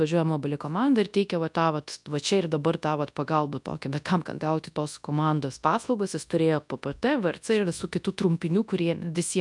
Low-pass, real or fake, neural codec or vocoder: 10.8 kHz; fake; codec, 24 kHz, 0.9 kbps, WavTokenizer, large speech release